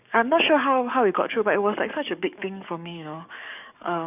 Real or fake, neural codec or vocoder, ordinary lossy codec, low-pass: fake; codec, 44.1 kHz, 7.8 kbps, DAC; none; 3.6 kHz